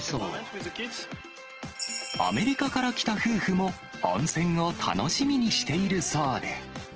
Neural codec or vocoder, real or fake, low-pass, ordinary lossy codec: none; real; 7.2 kHz; Opus, 16 kbps